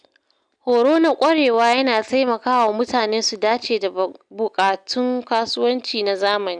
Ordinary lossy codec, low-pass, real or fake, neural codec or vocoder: none; 9.9 kHz; real; none